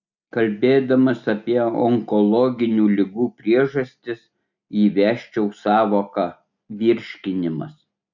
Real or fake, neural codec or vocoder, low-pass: real; none; 7.2 kHz